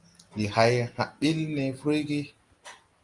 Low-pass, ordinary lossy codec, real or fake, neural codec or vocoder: 10.8 kHz; Opus, 32 kbps; real; none